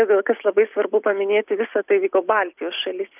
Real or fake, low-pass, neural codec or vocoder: real; 3.6 kHz; none